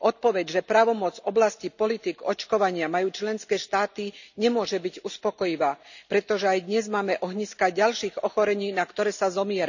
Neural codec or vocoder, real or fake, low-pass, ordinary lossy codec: none; real; 7.2 kHz; none